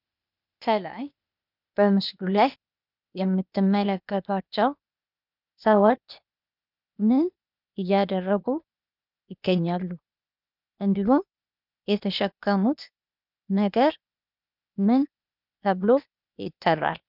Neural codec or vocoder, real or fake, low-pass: codec, 16 kHz, 0.8 kbps, ZipCodec; fake; 5.4 kHz